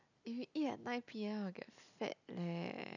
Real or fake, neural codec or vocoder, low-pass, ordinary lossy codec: real; none; 7.2 kHz; none